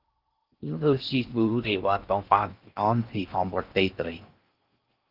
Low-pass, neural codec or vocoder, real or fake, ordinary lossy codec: 5.4 kHz; codec, 16 kHz in and 24 kHz out, 0.6 kbps, FocalCodec, streaming, 4096 codes; fake; Opus, 32 kbps